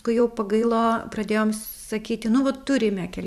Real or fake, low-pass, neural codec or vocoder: fake; 14.4 kHz; vocoder, 44.1 kHz, 128 mel bands every 512 samples, BigVGAN v2